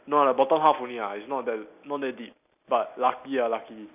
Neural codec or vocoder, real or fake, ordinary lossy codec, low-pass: none; real; none; 3.6 kHz